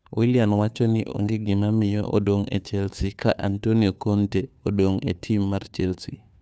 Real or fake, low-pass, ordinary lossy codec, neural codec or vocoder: fake; none; none; codec, 16 kHz, 2 kbps, FunCodec, trained on Chinese and English, 25 frames a second